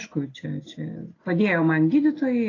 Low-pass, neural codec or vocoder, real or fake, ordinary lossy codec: 7.2 kHz; none; real; AAC, 32 kbps